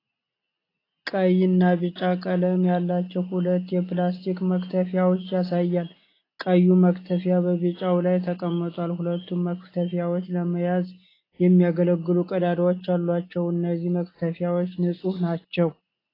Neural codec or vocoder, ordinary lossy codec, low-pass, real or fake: none; AAC, 24 kbps; 5.4 kHz; real